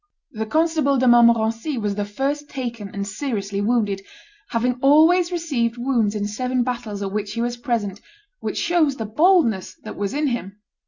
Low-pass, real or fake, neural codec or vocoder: 7.2 kHz; real; none